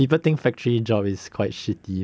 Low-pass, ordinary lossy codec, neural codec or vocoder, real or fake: none; none; none; real